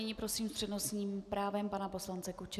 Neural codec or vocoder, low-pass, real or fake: none; 14.4 kHz; real